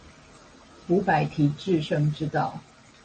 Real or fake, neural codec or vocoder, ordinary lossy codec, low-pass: real; none; MP3, 32 kbps; 9.9 kHz